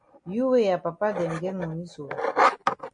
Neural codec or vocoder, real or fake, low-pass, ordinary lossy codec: none; real; 9.9 kHz; MP3, 48 kbps